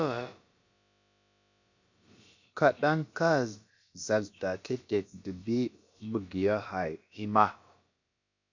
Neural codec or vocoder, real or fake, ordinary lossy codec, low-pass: codec, 16 kHz, about 1 kbps, DyCAST, with the encoder's durations; fake; MP3, 64 kbps; 7.2 kHz